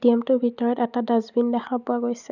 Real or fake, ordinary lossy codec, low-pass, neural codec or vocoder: real; none; 7.2 kHz; none